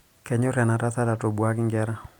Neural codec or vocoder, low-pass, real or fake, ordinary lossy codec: none; 19.8 kHz; real; none